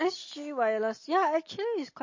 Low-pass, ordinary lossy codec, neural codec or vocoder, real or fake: 7.2 kHz; MP3, 32 kbps; none; real